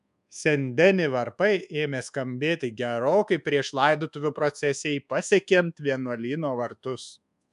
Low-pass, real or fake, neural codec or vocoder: 10.8 kHz; fake; codec, 24 kHz, 1.2 kbps, DualCodec